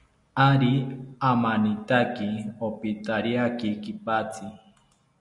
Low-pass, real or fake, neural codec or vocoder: 10.8 kHz; real; none